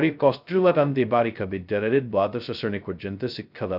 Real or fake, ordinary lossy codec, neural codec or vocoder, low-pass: fake; none; codec, 16 kHz, 0.2 kbps, FocalCodec; 5.4 kHz